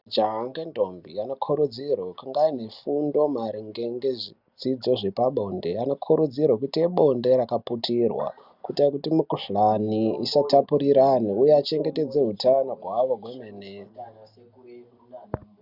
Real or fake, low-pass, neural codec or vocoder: real; 5.4 kHz; none